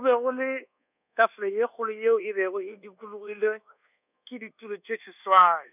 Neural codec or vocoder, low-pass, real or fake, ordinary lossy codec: codec, 24 kHz, 1.2 kbps, DualCodec; 3.6 kHz; fake; none